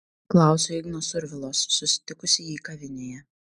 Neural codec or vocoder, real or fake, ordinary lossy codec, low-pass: none; real; MP3, 96 kbps; 9.9 kHz